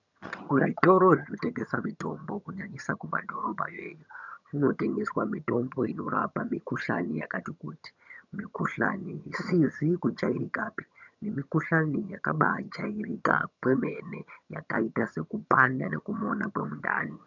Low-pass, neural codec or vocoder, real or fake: 7.2 kHz; vocoder, 22.05 kHz, 80 mel bands, HiFi-GAN; fake